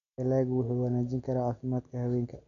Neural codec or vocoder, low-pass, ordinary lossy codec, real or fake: none; 7.2 kHz; none; real